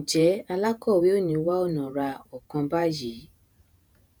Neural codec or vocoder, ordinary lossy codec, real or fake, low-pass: vocoder, 48 kHz, 128 mel bands, Vocos; none; fake; none